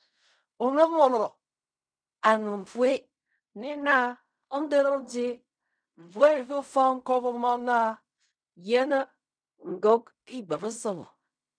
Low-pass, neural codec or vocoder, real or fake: 9.9 kHz; codec, 16 kHz in and 24 kHz out, 0.4 kbps, LongCat-Audio-Codec, fine tuned four codebook decoder; fake